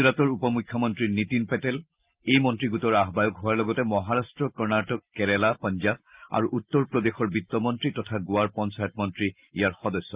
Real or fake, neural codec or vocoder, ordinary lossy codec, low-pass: real; none; Opus, 32 kbps; 3.6 kHz